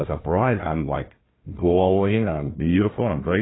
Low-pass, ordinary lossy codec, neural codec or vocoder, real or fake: 7.2 kHz; AAC, 16 kbps; codec, 16 kHz, 1 kbps, FunCodec, trained on Chinese and English, 50 frames a second; fake